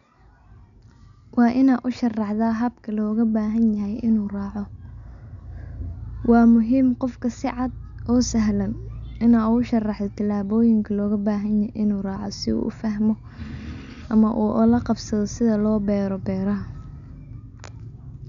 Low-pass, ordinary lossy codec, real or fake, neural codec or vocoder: 7.2 kHz; none; real; none